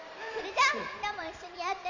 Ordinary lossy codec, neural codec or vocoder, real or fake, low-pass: MP3, 64 kbps; vocoder, 44.1 kHz, 128 mel bands every 512 samples, BigVGAN v2; fake; 7.2 kHz